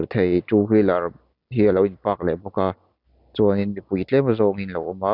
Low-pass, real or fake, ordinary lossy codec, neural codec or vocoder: 5.4 kHz; real; none; none